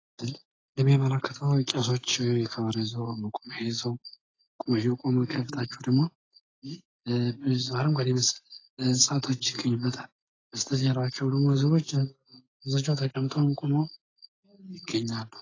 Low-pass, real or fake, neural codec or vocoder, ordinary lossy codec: 7.2 kHz; real; none; AAC, 32 kbps